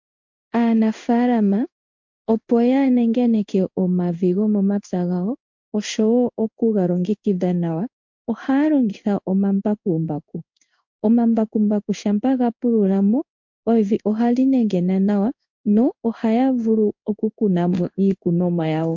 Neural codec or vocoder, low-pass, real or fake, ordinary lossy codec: codec, 16 kHz in and 24 kHz out, 1 kbps, XY-Tokenizer; 7.2 kHz; fake; MP3, 48 kbps